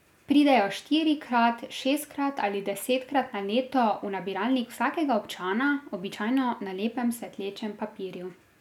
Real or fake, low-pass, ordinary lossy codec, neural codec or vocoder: real; 19.8 kHz; none; none